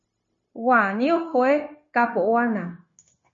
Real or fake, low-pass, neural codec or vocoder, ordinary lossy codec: fake; 7.2 kHz; codec, 16 kHz, 0.9 kbps, LongCat-Audio-Codec; MP3, 32 kbps